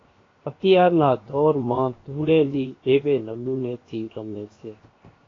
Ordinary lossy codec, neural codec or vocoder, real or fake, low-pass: AAC, 32 kbps; codec, 16 kHz, 0.7 kbps, FocalCodec; fake; 7.2 kHz